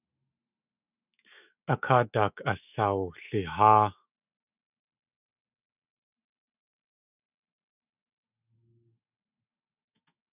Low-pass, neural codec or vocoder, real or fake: 3.6 kHz; autoencoder, 48 kHz, 128 numbers a frame, DAC-VAE, trained on Japanese speech; fake